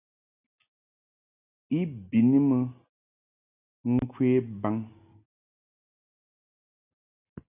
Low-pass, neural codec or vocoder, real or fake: 3.6 kHz; none; real